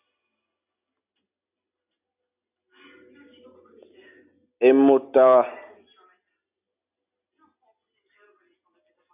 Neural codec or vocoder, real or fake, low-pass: none; real; 3.6 kHz